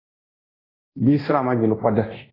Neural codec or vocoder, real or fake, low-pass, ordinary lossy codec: codec, 24 kHz, 0.9 kbps, DualCodec; fake; 5.4 kHz; AAC, 24 kbps